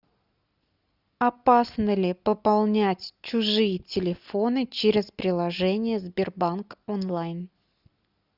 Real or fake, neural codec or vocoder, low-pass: real; none; 5.4 kHz